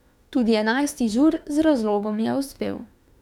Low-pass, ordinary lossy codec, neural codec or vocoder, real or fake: 19.8 kHz; none; autoencoder, 48 kHz, 32 numbers a frame, DAC-VAE, trained on Japanese speech; fake